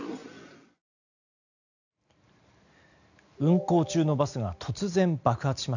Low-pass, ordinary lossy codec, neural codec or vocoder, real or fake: 7.2 kHz; none; none; real